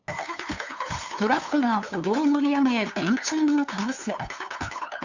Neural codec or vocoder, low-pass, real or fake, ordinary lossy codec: codec, 16 kHz, 2 kbps, FunCodec, trained on LibriTTS, 25 frames a second; 7.2 kHz; fake; Opus, 64 kbps